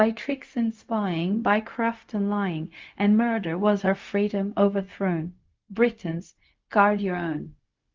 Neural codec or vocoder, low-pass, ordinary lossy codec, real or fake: codec, 16 kHz, 0.4 kbps, LongCat-Audio-Codec; 7.2 kHz; Opus, 24 kbps; fake